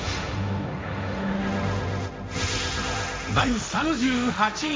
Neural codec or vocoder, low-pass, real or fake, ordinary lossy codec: codec, 16 kHz, 1.1 kbps, Voila-Tokenizer; none; fake; none